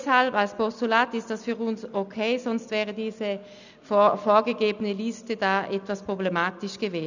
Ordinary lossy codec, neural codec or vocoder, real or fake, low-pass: none; none; real; 7.2 kHz